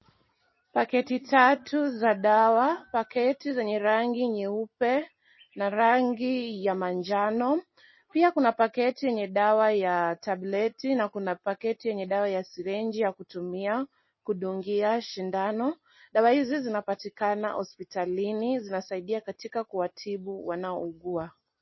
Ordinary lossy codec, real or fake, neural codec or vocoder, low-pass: MP3, 24 kbps; real; none; 7.2 kHz